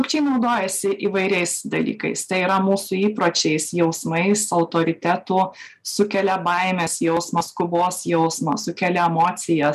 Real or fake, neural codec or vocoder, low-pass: real; none; 14.4 kHz